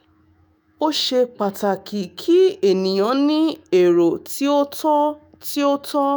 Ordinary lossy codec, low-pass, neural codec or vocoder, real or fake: none; none; autoencoder, 48 kHz, 128 numbers a frame, DAC-VAE, trained on Japanese speech; fake